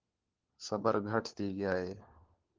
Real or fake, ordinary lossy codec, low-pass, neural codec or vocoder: fake; Opus, 16 kbps; 7.2 kHz; codec, 16 kHz, 4 kbps, FunCodec, trained on LibriTTS, 50 frames a second